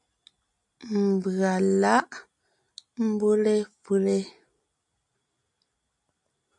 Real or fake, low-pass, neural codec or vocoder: real; 10.8 kHz; none